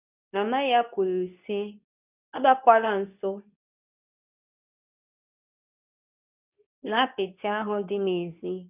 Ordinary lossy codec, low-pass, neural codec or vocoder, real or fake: none; 3.6 kHz; codec, 24 kHz, 0.9 kbps, WavTokenizer, medium speech release version 2; fake